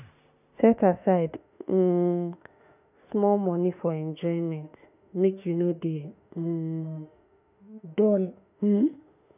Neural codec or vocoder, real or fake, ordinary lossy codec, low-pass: autoencoder, 48 kHz, 32 numbers a frame, DAC-VAE, trained on Japanese speech; fake; none; 3.6 kHz